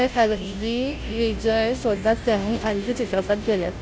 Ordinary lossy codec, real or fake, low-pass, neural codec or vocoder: none; fake; none; codec, 16 kHz, 0.5 kbps, FunCodec, trained on Chinese and English, 25 frames a second